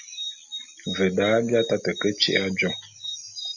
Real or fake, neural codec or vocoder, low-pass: real; none; 7.2 kHz